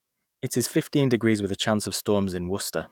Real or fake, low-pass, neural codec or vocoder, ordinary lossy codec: fake; 19.8 kHz; codec, 44.1 kHz, 7.8 kbps, DAC; none